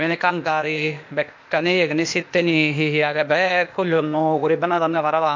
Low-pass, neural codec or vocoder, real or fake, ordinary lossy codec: 7.2 kHz; codec, 16 kHz, 0.8 kbps, ZipCodec; fake; MP3, 48 kbps